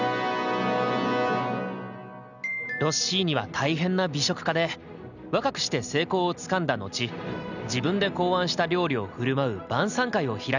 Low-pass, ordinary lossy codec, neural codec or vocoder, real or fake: 7.2 kHz; none; none; real